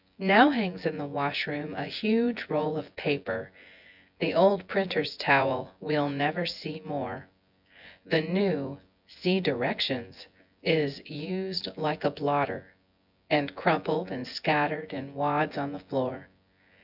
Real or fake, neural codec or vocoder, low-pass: fake; vocoder, 24 kHz, 100 mel bands, Vocos; 5.4 kHz